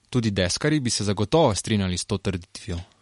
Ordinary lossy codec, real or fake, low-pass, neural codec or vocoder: MP3, 48 kbps; real; 14.4 kHz; none